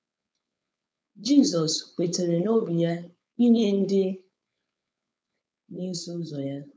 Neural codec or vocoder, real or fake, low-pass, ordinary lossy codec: codec, 16 kHz, 4.8 kbps, FACodec; fake; none; none